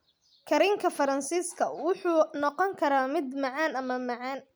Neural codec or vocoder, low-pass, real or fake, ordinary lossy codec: none; none; real; none